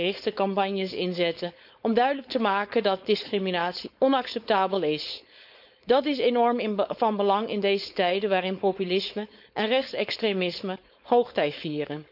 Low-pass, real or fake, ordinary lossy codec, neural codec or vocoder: 5.4 kHz; fake; none; codec, 16 kHz, 4.8 kbps, FACodec